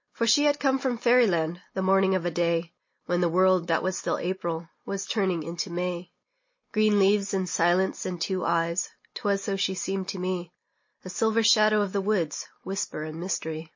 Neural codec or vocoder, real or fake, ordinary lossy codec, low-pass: none; real; MP3, 32 kbps; 7.2 kHz